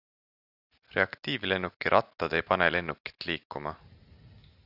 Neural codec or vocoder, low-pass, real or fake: none; 5.4 kHz; real